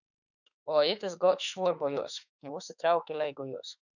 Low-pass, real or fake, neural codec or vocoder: 7.2 kHz; fake; autoencoder, 48 kHz, 32 numbers a frame, DAC-VAE, trained on Japanese speech